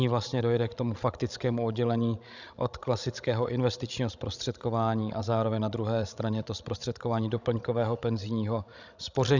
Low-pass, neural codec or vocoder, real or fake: 7.2 kHz; codec, 16 kHz, 16 kbps, FreqCodec, larger model; fake